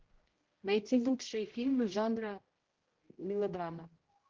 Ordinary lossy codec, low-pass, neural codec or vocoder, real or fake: Opus, 16 kbps; 7.2 kHz; codec, 16 kHz, 0.5 kbps, X-Codec, HuBERT features, trained on general audio; fake